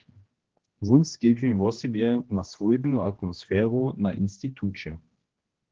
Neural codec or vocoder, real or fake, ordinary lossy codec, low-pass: codec, 16 kHz, 1 kbps, X-Codec, HuBERT features, trained on general audio; fake; Opus, 16 kbps; 7.2 kHz